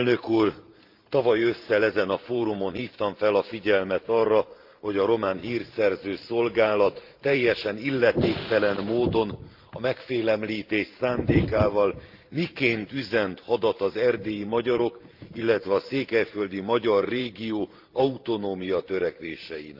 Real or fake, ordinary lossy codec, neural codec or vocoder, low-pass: fake; Opus, 32 kbps; vocoder, 44.1 kHz, 128 mel bands every 512 samples, BigVGAN v2; 5.4 kHz